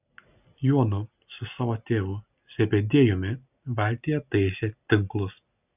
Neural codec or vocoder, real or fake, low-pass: none; real; 3.6 kHz